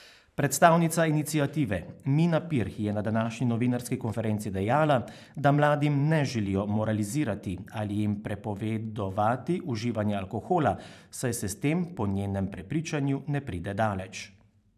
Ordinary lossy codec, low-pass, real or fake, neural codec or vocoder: none; 14.4 kHz; fake; vocoder, 44.1 kHz, 128 mel bands every 512 samples, BigVGAN v2